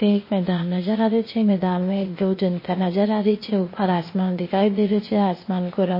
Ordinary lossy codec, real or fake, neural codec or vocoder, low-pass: MP3, 24 kbps; fake; codec, 16 kHz, 0.8 kbps, ZipCodec; 5.4 kHz